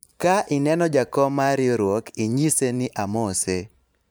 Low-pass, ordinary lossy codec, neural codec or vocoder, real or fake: none; none; vocoder, 44.1 kHz, 128 mel bands every 512 samples, BigVGAN v2; fake